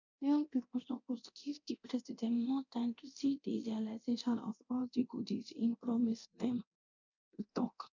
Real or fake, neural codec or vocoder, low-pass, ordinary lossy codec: fake; codec, 24 kHz, 0.9 kbps, DualCodec; 7.2 kHz; none